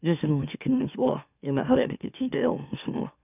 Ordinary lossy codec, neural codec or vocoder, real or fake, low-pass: none; autoencoder, 44.1 kHz, a latent of 192 numbers a frame, MeloTTS; fake; 3.6 kHz